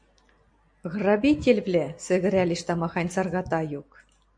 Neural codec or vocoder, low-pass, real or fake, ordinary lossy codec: none; 9.9 kHz; real; MP3, 48 kbps